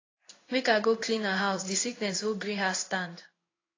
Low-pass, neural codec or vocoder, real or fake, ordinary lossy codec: 7.2 kHz; codec, 16 kHz in and 24 kHz out, 1 kbps, XY-Tokenizer; fake; AAC, 32 kbps